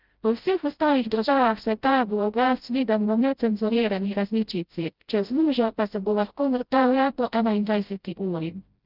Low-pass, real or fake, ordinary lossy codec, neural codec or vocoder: 5.4 kHz; fake; Opus, 24 kbps; codec, 16 kHz, 0.5 kbps, FreqCodec, smaller model